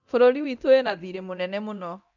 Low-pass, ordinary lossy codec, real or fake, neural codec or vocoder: 7.2 kHz; none; fake; codec, 24 kHz, 0.9 kbps, DualCodec